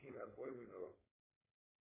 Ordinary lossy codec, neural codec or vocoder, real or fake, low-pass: MP3, 16 kbps; codec, 16 kHz, 4.8 kbps, FACodec; fake; 3.6 kHz